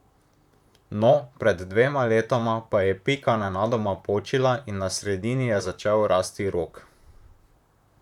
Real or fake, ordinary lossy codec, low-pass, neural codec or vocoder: fake; none; 19.8 kHz; vocoder, 44.1 kHz, 128 mel bands, Pupu-Vocoder